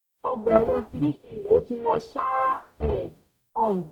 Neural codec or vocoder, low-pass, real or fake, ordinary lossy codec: codec, 44.1 kHz, 0.9 kbps, DAC; 19.8 kHz; fake; none